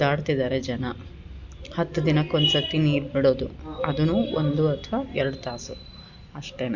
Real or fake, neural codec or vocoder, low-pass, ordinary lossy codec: real; none; 7.2 kHz; none